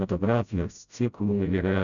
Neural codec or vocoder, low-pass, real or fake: codec, 16 kHz, 0.5 kbps, FreqCodec, smaller model; 7.2 kHz; fake